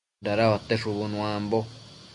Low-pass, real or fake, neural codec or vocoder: 10.8 kHz; real; none